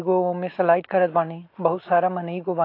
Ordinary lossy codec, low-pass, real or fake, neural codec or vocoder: AAC, 32 kbps; 5.4 kHz; real; none